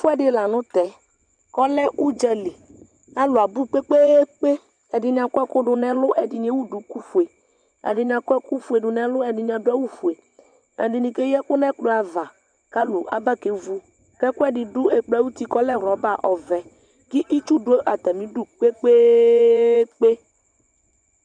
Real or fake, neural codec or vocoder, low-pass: fake; vocoder, 24 kHz, 100 mel bands, Vocos; 9.9 kHz